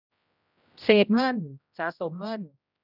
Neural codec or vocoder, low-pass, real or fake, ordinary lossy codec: codec, 16 kHz, 0.5 kbps, X-Codec, HuBERT features, trained on general audio; 5.4 kHz; fake; none